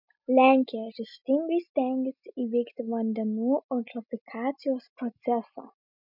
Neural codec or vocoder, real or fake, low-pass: none; real; 5.4 kHz